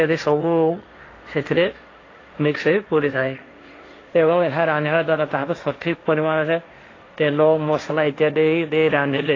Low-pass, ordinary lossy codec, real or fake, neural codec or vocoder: 7.2 kHz; AAC, 32 kbps; fake; codec, 16 kHz, 1.1 kbps, Voila-Tokenizer